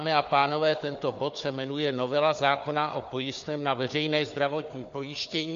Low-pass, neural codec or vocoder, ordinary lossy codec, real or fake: 7.2 kHz; codec, 16 kHz, 4 kbps, FunCodec, trained on Chinese and English, 50 frames a second; MP3, 48 kbps; fake